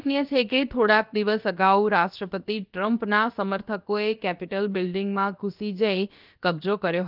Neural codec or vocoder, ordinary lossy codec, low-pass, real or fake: codec, 16 kHz, about 1 kbps, DyCAST, with the encoder's durations; Opus, 24 kbps; 5.4 kHz; fake